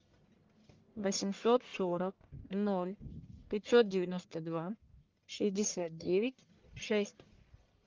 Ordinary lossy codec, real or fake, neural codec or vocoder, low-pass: Opus, 32 kbps; fake; codec, 44.1 kHz, 1.7 kbps, Pupu-Codec; 7.2 kHz